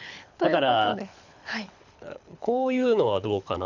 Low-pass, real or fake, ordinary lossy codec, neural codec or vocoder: 7.2 kHz; fake; none; codec, 24 kHz, 6 kbps, HILCodec